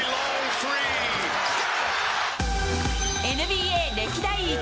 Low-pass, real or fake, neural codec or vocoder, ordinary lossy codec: none; real; none; none